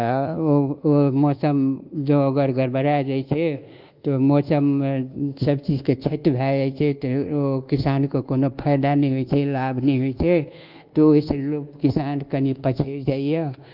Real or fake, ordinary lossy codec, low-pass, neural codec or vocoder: fake; Opus, 32 kbps; 5.4 kHz; codec, 24 kHz, 1.2 kbps, DualCodec